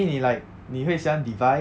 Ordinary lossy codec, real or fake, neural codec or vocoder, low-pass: none; real; none; none